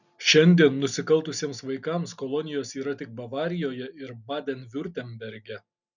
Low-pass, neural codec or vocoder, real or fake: 7.2 kHz; none; real